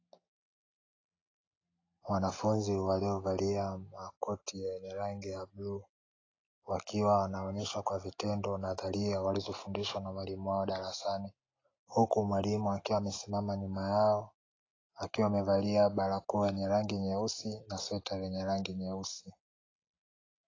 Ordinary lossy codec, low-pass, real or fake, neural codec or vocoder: AAC, 32 kbps; 7.2 kHz; real; none